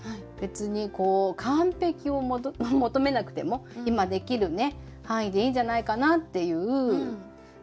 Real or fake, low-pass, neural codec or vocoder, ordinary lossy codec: real; none; none; none